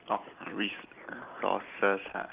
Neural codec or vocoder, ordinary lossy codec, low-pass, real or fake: none; Opus, 24 kbps; 3.6 kHz; real